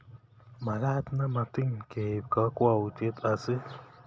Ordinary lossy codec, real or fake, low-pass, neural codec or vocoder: none; real; none; none